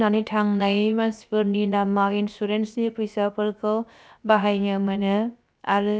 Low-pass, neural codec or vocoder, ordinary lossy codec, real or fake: none; codec, 16 kHz, about 1 kbps, DyCAST, with the encoder's durations; none; fake